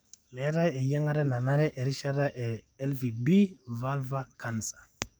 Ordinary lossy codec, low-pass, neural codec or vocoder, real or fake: none; none; codec, 44.1 kHz, 7.8 kbps, DAC; fake